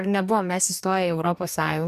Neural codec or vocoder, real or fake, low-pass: codec, 44.1 kHz, 2.6 kbps, DAC; fake; 14.4 kHz